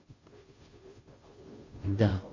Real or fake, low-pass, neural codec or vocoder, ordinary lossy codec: fake; 7.2 kHz; codec, 16 kHz, 0.5 kbps, FunCodec, trained on Chinese and English, 25 frames a second; MP3, 32 kbps